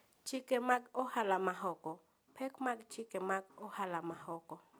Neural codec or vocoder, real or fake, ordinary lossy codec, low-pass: none; real; none; none